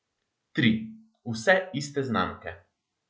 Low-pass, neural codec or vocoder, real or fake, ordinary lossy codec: none; none; real; none